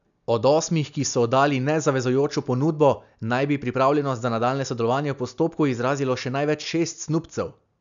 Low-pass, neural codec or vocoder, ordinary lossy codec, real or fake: 7.2 kHz; none; none; real